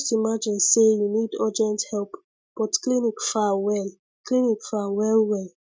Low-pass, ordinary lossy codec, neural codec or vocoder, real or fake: none; none; none; real